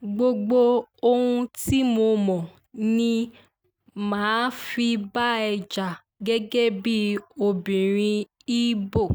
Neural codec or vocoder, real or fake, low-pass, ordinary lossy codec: none; real; none; none